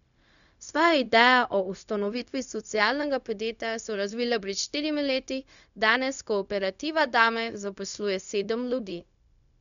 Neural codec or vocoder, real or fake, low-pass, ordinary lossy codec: codec, 16 kHz, 0.4 kbps, LongCat-Audio-Codec; fake; 7.2 kHz; none